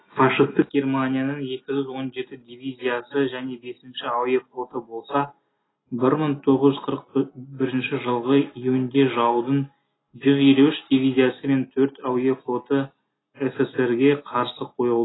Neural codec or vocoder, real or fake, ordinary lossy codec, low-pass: none; real; AAC, 16 kbps; 7.2 kHz